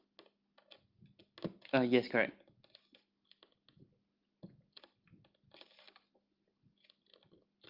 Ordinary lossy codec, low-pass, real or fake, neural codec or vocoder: Opus, 32 kbps; 5.4 kHz; real; none